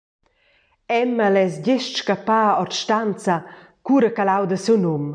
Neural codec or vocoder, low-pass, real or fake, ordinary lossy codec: none; 9.9 kHz; real; MP3, 96 kbps